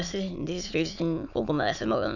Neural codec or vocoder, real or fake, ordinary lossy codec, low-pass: autoencoder, 22.05 kHz, a latent of 192 numbers a frame, VITS, trained on many speakers; fake; none; 7.2 kHz